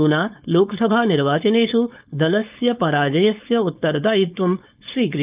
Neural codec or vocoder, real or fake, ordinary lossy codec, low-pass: codec, 16 kHz, 4.8 kbps, FACodec; fake; Opus, 32 kbps; 3.6 kHz